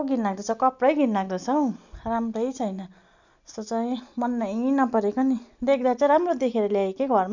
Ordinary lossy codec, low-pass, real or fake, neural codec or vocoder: none; 7.2 kHz; real; none